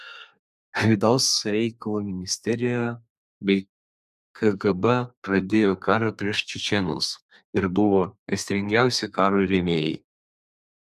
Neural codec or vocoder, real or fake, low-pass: codec, 32 kHz, 1.9 kbps, SNAC; fake; 14.4 kHz